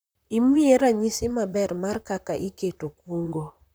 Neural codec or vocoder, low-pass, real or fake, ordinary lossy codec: vocoder, 44.1 kHz, 128 mel bands, Pupu-Vocoder; none; fake; none